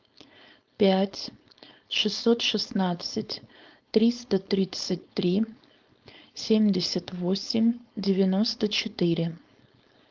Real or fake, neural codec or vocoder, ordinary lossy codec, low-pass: fake; codec, 16 kHz, 4.8 kbps, FACodec; Opus, 24 kbps; 7.2 kHz